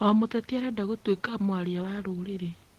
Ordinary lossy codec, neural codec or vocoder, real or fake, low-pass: Opus, 16 kbps; none; real; 14.4 kHz